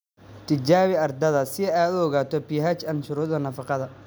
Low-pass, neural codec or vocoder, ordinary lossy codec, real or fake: none; none; none; real